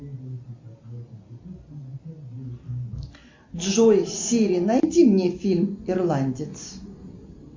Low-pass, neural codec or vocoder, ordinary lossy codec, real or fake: 7.2 kHz; none; MP3, 64 kbps; real